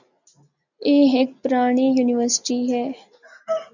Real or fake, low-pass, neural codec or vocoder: real; 7.2 kHz; none